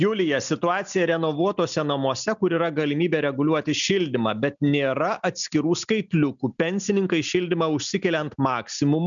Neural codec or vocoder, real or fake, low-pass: none; real; 7.2 kHz